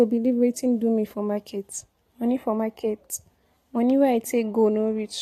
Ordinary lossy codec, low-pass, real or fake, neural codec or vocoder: AAC, 48 kbps; 14.4 kHz; real; none